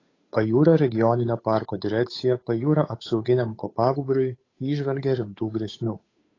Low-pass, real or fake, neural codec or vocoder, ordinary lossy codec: 7.2 kHz; fake; codec, 16 kHz, 8 kbps, FunCodec, trained on Chinese and English, 25 frames a second; AAC, 32 kbps